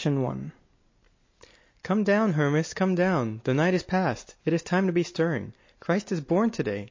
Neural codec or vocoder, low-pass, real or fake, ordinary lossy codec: none; 7.2 kHz; real; MP3, 32 kbps